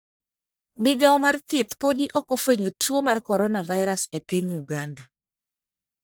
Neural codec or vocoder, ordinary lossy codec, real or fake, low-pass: codec, 44.1 kHz, 1.7 kbps, Pupu-Codec; none; fake; none